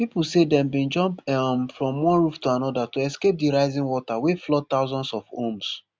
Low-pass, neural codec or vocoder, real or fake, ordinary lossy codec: none; none; real; none